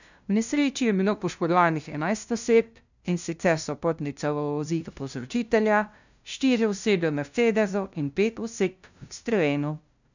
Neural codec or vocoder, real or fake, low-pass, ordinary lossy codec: codec, 16 kHz, 0.5 kbps, FunCodec, trained on LibriTTS, 25 frames a second; fake; 7.2 kHz; none